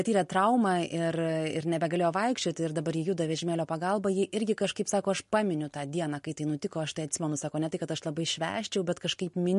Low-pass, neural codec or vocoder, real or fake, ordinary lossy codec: 14.4 kHz; none; real; MP3, 48 kbps